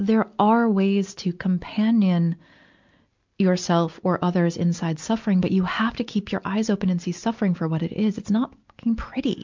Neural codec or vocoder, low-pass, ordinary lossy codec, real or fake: none; 7.2 kHz; MP3, 64 kbps; real